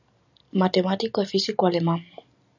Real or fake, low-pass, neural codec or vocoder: real; 7.2 kHz; none